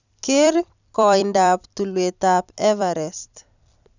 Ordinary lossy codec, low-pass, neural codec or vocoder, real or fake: none; 7.2 kHz; vocoder, 44.1 kHz, 128 mel bands every 256 samples, BigVGAN v2; fake